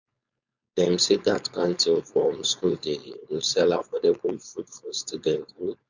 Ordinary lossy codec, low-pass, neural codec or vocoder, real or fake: none; 7.2 kHz; codec, 16 kHz, 4.8 kbps, FACodec; fake